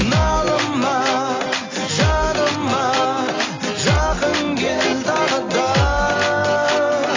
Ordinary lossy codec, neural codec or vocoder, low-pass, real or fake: AAC, 32 kbps; none; 7.2 kHz; real